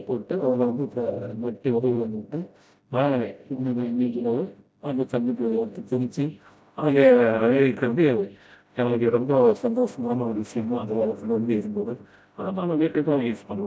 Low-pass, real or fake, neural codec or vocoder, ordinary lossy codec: none; fake; codec, 16 kHz, 0.5 kbps, FreqCodec, smaller model; none